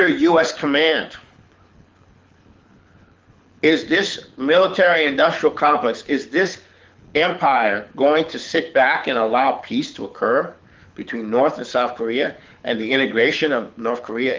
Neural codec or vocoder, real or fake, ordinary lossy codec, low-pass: codec, 24 kHz, 6 kbps, HILCodec; fake; Opus, 32 kbps; 7.2 kHz